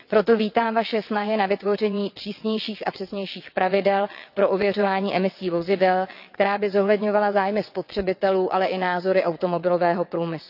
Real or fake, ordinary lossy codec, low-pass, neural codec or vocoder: fake; none; 5.4 kHz; vocoder, 22.05 kHz, 80 mel bands, WaveNeXt